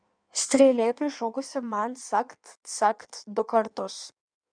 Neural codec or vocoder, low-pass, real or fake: codec, 16 kHz in and 24 kHz out, 1.1 kbps, FireRedTTS-2 codec; 9.9 kHz; fake